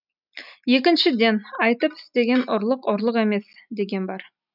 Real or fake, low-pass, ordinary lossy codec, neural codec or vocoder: real; 5.4 kHz; none; none